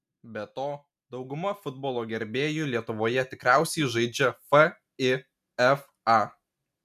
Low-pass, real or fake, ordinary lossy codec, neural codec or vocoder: 14.4 kHz; real; MP3, 96 kbps; none